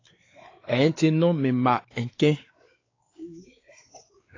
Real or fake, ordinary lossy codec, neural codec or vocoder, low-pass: fake; AAC, 32 kbps; codec, 16 kHz, 4 kbps, X-Codec, WavLM features, trained on Multilingual LibriSpeech; 7.2 kHz